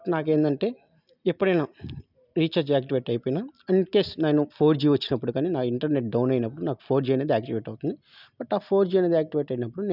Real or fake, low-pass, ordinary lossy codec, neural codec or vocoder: real; 5.4 kHz; none; none